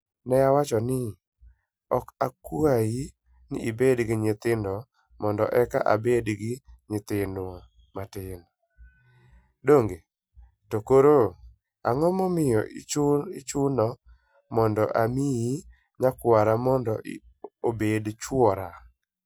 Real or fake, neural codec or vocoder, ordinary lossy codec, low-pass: real; none; none; none